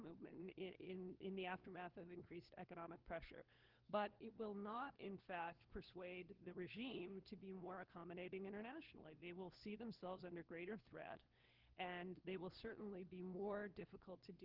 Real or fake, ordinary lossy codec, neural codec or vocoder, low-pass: fake; Opus, 16 kbps; codec, 16 kHz, 4 kbps, FunCodec, trained on LibriTTS, 50 frames a second; 5.4 kHz